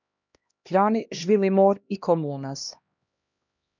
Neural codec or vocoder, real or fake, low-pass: codec, 16 kHz, 1 kbps, X-Codec, HuBERT features, trained on LibriSpeech; fake; 7.2 kHz